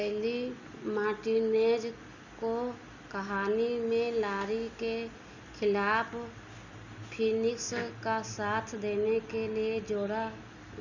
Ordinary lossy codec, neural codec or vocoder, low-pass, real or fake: none; none; 7.2 kHz; real